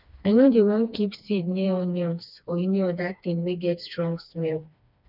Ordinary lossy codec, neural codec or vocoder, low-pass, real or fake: none; codec, 16 kHz, 2 kbps, FreqCodec, smaller model; 5.4 kHz; fake